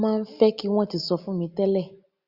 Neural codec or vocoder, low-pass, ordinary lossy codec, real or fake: none; 5.4 kHz; Opus, 64 kbps; real